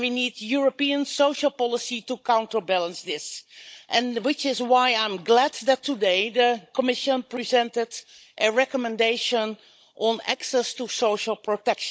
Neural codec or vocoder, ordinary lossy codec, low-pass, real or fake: codec, 16 kHz, 16 kbps, FunCodec, trained on Chinese and English, 50 frames a second; none; none; fake